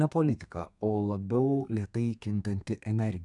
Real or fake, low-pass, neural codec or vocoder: fake; 10.8 kHz; codec, 32 kHz, 1.9 kbps, SNAC